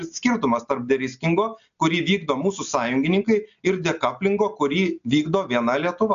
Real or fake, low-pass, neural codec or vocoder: real; 7.2 kHz; none